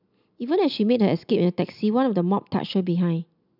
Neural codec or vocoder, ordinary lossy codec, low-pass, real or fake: none; none; 5.4 kHz; real